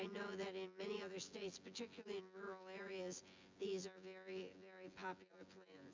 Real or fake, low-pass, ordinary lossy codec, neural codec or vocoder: fake; 7.2 kHz; MP3, 64 kbps; vocoder, 24 kHz, 100 mel bands, Vocos